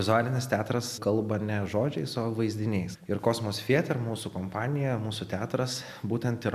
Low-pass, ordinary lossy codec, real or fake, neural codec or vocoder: 14.4 kHz; MP3, 96 kbps; real; none